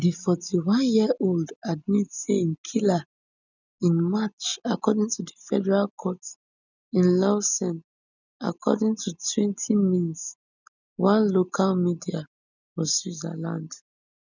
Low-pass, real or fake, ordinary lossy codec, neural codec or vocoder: 7.2 kHz; real; none; none